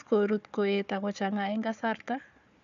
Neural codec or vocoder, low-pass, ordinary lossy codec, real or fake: codec, 16 kHz, 4 kbps, FunCodec, trained on Chinese and English, 50 frames a second; 7.2 kHz; none; fake